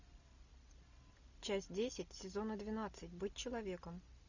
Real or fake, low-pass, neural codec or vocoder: real; 7.2 kHz; none